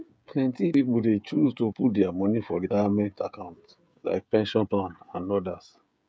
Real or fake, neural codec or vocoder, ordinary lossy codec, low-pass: fake; codec, 16 kHz, 16 kbps, FreqCodec, smaller model; none; none